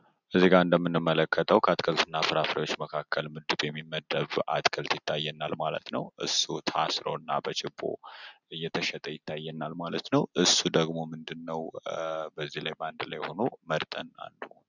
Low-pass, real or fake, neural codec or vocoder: 7.2 kHz; real; none